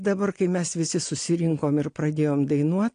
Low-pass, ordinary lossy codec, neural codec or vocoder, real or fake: 9.9 kHz; AAC, 48 kbps; none; real